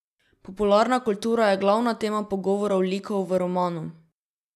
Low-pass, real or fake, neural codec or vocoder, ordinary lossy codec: 14.4 kHz; real; none; none